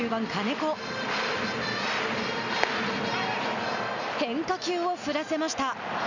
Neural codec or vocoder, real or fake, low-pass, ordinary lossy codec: none; real; 7.2 kHz; none